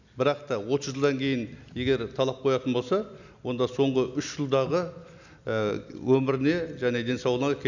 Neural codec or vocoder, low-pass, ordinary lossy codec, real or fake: none; 7.2 kHz; none; real